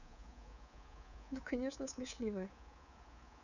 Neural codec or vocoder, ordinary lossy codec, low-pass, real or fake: codec, 24 kHz, 3.1 kbps, DualCodec; none; 7.2 kHz; fake